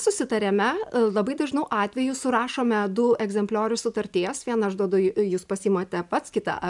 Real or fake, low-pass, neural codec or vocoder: real; 10.8 kHz; none